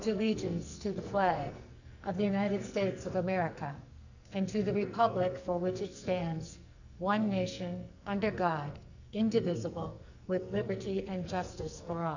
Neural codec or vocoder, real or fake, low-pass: codec, 44.1 kHz, 2.6 kbps, SNAC; fake; 7.2 kHz